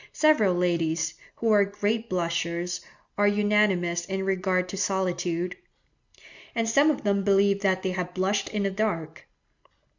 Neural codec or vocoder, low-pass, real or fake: none; 7.2 kHz; real